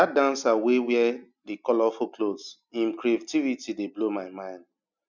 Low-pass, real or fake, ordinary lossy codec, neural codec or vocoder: 7.2 kHz; real; none; none